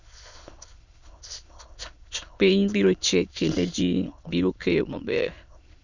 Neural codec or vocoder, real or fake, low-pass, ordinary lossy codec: autoencoder, 22.05 kHz, a latent of 192 numbers a frame, VITS, trained on many speakers; fake; 7.2 kHz; none